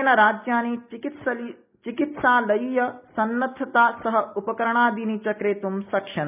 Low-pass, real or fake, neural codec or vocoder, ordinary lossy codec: 3.6 kHz; real; none; none